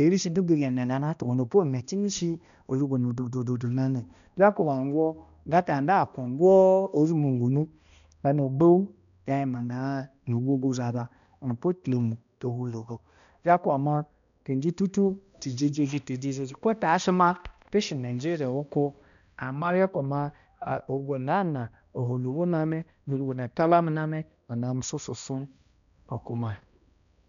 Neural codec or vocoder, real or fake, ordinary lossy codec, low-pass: codec, 16 kHz, 1 kbps, X-Codec, HuBERT features, trained on balanced general audio; fake; none; 7.2 kHz